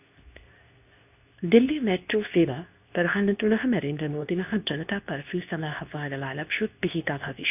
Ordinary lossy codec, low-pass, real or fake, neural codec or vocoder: none; 3.6 kHz; fake; codec, 24 kHz, 0.9 kbps, WavTokenizer, medium speech release version 2